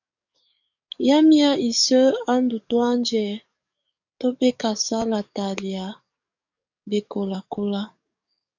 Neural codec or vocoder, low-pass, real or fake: codec, 44.1 kHz, 7.8 kbps, DAC; 7.2 kHz; fake